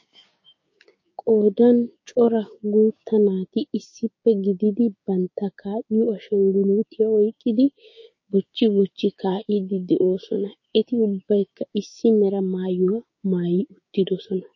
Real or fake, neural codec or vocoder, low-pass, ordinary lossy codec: fake; codec, 16 kHz, 6 kbps, DAC; 7.2 kHz; MP3, 32 kbps